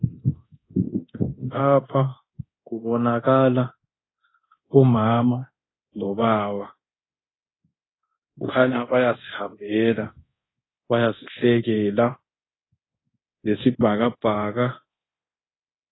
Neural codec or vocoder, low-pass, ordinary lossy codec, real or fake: codec, 24 kHz, 0.9 kbps, DualCodec; 7.2 kHz; AAC, 16 kbps; fake